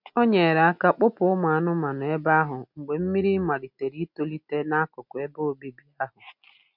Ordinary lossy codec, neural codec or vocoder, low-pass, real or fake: MP3, 48 kbps; none; 5.4 kHz; real